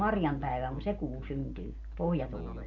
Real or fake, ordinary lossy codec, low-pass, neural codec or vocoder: real; none; 7.2 kHz; none